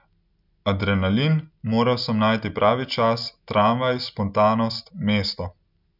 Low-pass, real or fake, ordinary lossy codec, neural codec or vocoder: 5.4 kHz; real; none; none